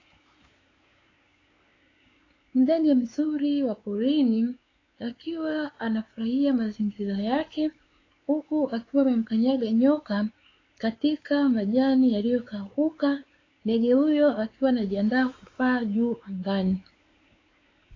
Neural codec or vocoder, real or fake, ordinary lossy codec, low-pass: codec, 16 kHz, 4 kbps, X-Codec, WavLM features, trained on Multilingual LibriSpeech; fake; AAC, 32 kbps; 7.2 kHz